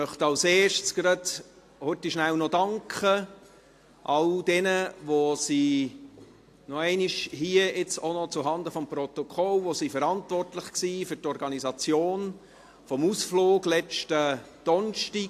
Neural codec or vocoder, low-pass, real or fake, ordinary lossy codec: none; 14.4 kHz; real; AAC, 64 kbps